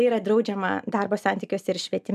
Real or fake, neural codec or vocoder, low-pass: real; none; 14.4 kHz